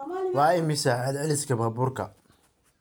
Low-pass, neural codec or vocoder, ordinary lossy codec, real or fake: none; none; none; real